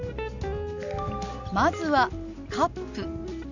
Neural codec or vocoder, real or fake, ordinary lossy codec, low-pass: none; real; none; 7.2 kHz